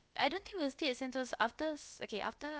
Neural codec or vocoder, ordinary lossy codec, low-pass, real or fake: codec, 16 kHz, about 1 kbps, DyCAST, with the encoder's durations; none; none; fake